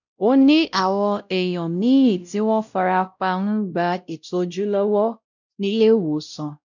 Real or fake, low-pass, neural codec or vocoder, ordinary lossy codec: fake; 7.2 kHz; codec, 16 kHz, 0.5 kbps, X-Codec, WavLM features, trained on Multilingual LibriSpeech; none